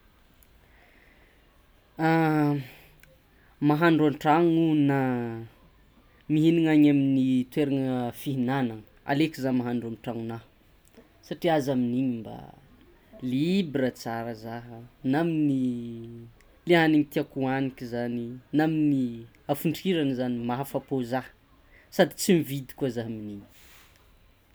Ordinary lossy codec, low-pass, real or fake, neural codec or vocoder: none; none; real; none